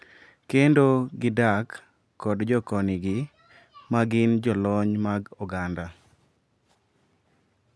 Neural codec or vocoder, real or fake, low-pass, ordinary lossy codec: none; real; none; none